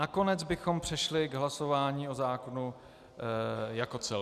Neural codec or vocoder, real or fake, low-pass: none; real; 14.4 kHz